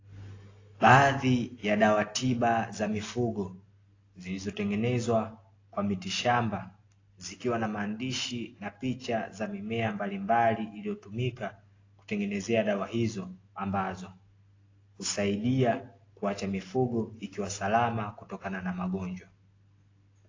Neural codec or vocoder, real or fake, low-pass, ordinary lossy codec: none; real; 7.2 kHz; AAC, 32 kbps